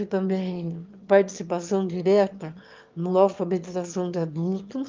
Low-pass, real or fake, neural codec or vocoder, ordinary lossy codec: 7.2 kHz; fake; autoencoder, 22.05 kHz, a latent of 192 numbers a frame, VITS, trained on one speaker; Opus, 32 kbps